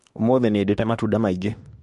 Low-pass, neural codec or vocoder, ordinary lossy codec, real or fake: 14.4 kHz; autoencoder, 48 kHz, 32 numbers a frame, DAC-VAE, trained on Japanese speech; MP3, 48 kbps; fake